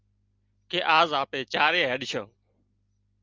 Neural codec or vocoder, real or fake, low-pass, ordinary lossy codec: none; real; 7.2 kHz; Opus, 24 kbps